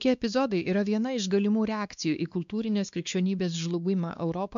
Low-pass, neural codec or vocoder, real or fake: 7.2 kHz; codec, 16 kHz, 2 kbps, X-Codec, WavLM features, trained on Multilingual LibriSpeech; fake